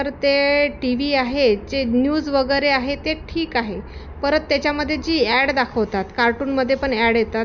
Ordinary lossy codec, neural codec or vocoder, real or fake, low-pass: none; none; real; 7.2 kHz